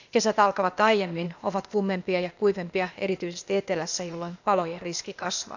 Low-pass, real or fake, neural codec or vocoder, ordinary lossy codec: 7.2 kHz; fake; codec, 16 kHz, 0.8 kbps, ZipCodec; none